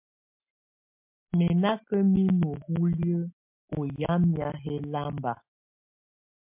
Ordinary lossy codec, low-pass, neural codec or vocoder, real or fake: MP3, 32 kbps; 3.6 kHz; none; real